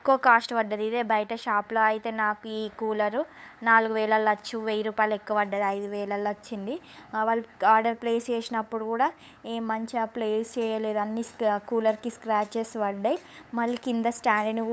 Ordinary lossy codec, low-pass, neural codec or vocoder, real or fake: none; none; codec, 16 kHz, 8 kbps, FunCodec, trained on LibriTTS, 25 frames a second; fake